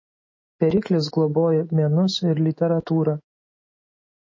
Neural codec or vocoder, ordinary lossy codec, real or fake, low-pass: autoencoder, 48 kHz, 128 numbers a frame, DAC-VAE, trained on Japanese speech; MP3, 32 kbps; fake; 7.2 kHz